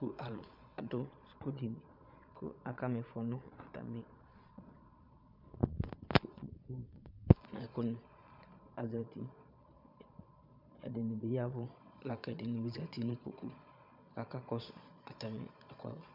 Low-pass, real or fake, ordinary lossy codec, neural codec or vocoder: 5.4 kHz; fake; MP3, 48 kbps; vocoder, 22.05 kHz, 80 mel bands, Vocos